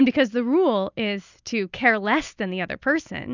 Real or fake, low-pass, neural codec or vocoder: real; 7.2 kHz; none